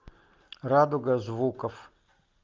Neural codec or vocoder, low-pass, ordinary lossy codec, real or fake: none; 7.2 kHz; Opus, 32 kbps; real